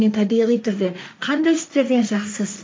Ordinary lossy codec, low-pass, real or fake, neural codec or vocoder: none; none; fake; codec, 16 kHz, 1.1 kbps, Voila-Tokenizer